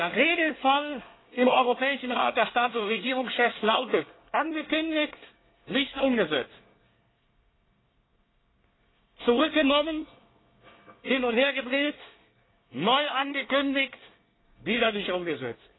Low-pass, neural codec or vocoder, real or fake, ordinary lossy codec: 7.2 kHz; codec, 24 kHz, 1 kbps, SNAC; fake; AAC, 16 kbps